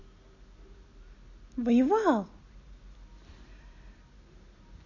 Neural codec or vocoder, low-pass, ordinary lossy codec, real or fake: none; 7.2 kHz; none; real